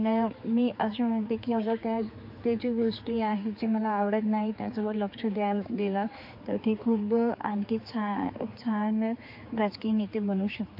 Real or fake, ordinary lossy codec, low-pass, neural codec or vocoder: fake; MP3, 32 kbps; 5.4 kHz; codec, 16 kHz, 4 kbps, X-Codec, HuBERT features, trained on general audio